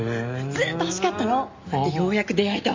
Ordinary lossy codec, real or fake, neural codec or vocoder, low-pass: MP3, 48 kbps; real; none; 7.2 kHz